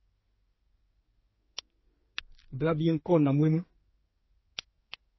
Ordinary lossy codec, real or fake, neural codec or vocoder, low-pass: MP3, 24 kbps; fake; codec, 32 kHz, 1.9 kbps, SNAC; 7.2 kHz